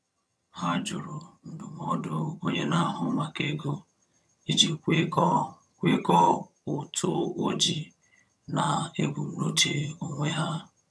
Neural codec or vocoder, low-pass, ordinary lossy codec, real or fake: vocoder, 22.05 kHz, 80 mel bands, HiFi-GAN; none; none; fake